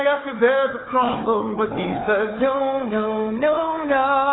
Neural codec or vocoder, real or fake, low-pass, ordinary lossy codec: codec, 16 kHz, 4 kbps, FunCodec, trained on Chinese and English, 50 frames a second; fake; 7.2 kHz; AAC, 16 kbps